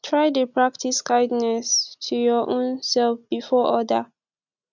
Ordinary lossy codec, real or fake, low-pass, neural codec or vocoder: none; real; 7.2 kHz; none